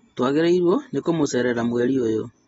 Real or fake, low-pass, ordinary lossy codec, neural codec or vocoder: real; 19.8 kHz; AAC, 24 kbps; none